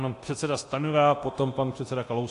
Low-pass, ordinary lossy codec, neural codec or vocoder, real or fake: 10.8 kHz; AAC, 48 kbps; codec, 24 kHz, 0.9 kbps, DualCodec; fake